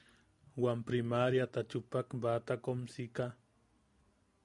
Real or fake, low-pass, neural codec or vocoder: real; 10.8 kHz; none